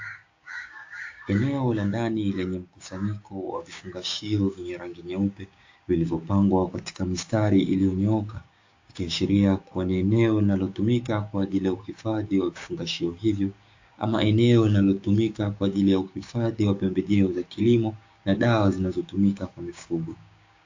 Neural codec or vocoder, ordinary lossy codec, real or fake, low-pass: codec, 16 kHz, 6 kbps, DAC; AAC, 48 kbps; fake; 7.2 kHz